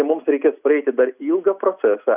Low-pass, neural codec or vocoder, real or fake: 3.6 kHz; none; real